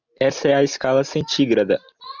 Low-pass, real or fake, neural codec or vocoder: 7.2 kHz; real; none